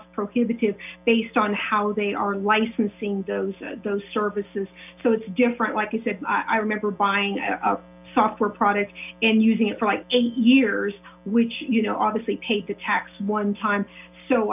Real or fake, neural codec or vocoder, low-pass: real; none; 3.6 kHz